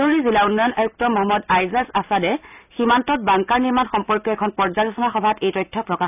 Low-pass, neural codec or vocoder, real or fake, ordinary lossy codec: 3.6 kHz; none; real; none